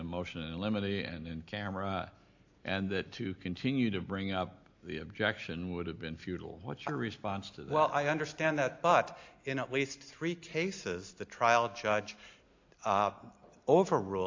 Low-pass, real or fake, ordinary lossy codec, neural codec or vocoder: 7.2 kHz; real; AAC, 48 kbps; none